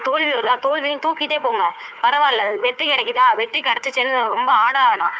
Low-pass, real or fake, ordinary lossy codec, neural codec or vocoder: none; fake; none; codec, 16 kHz, 4 kbps, FunCodec, trained on Chinese and English, 50 frames a second